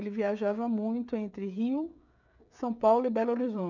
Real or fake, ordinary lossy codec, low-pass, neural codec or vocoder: fake; none; 7.2 kHz; codec, 16 kHz, 16 kbps, FreqCodec, smaller model